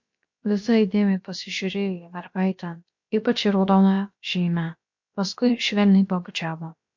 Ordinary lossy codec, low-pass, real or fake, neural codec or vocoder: MP3, 48 kbps; 7.2 kHz; fake; codec, 16 kHz, about 1 kbps, DyCAST, with the encoder's durations